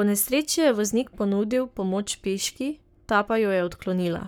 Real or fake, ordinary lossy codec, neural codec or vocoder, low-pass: fake; none; codec, 44.1 kHz, 7.8 kbps, Pupu-Codec; none